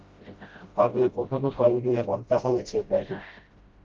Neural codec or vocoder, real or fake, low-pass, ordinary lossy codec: codec, 16 kHz, 0.5 kbps, FreqCodec, smaller model; fake; 7.2 kHz; Opus, 32 kbps